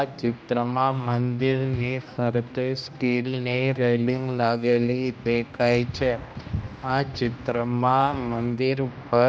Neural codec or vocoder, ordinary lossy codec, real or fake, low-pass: codec, 16 kHz, 1 kbps, X-Codec, HuBERT features, trained on general audio; none; fake; none